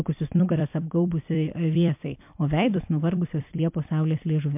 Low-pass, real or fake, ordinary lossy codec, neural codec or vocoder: 3.6 kHz; fake; MP3, 32 kbps; vocoder, 44.1 kHz, 128 mel bands every 256 samples, BigVGAN v2